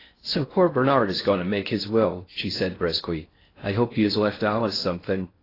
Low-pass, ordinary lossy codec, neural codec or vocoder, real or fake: 5.4 kHz; AAC, 24 kbps; codec, 16 kHz in and 24 kHz out, 0.6 kbps, FocalCodec, streaming, 4096 codes; fake